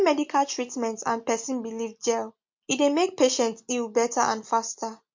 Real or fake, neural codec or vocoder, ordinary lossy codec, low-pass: real; none; MP3, 48 kbps; 7.2 kHz